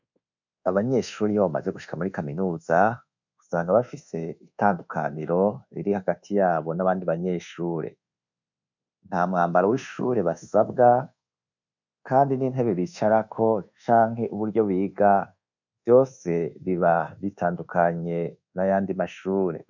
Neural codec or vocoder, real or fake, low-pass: codec, 24 kHz, 1.2 kbps, DualCodec; fake; 7.2 kHz